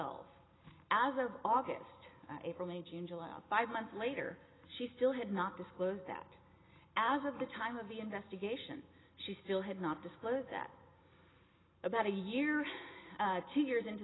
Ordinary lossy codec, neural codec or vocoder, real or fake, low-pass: AAC, 16 kbps; none; real; 7.2 kHz